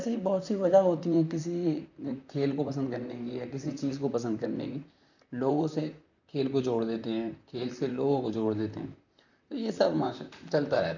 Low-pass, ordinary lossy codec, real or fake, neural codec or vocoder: 7.2 kHz; none; fake; vocoder, 44.1 kHz, 128 mel bands, Pupu-Vocoder